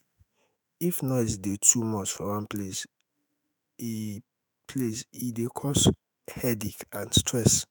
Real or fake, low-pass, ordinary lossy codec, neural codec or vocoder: fake; none; none; autoencoder, 48 kHz, 128 numbers a frame, DAC-VAE, trained on Japanese speech